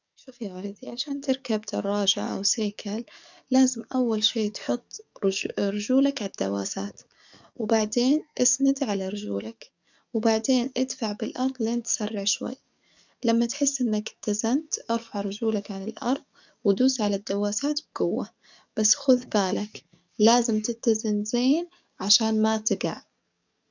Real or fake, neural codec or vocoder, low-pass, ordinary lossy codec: fake; codec, 44.1 kHz, 7.8 kbps, DAC; 7.2 kHz; none